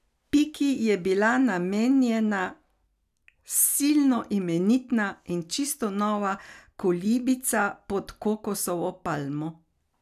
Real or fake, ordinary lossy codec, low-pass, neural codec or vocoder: real; none; 14.4 kHz; none